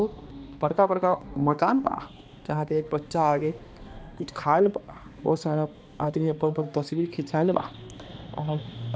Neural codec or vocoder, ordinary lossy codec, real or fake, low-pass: codec, 16 kHz, 2 kbps, X-Codec, HuBERT features, trained on balanced general audio; none; fake; none